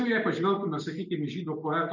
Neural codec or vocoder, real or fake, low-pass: none; real; 7.2 kHz